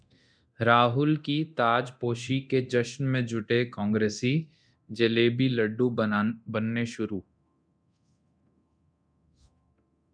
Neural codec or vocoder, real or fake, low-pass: codec, 24 kHz, 0.9 kbps, DualCodec; fake; 9.9 kHz